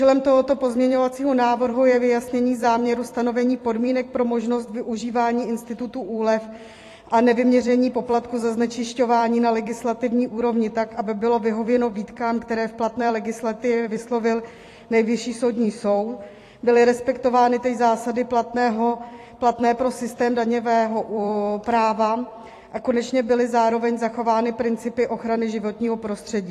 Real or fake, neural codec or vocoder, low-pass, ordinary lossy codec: fake; vocoder, 44.1 kHz, 128 mel bands every 256 samples, BigVGAN v2; 14.4 kHz; AAC, 48 kbps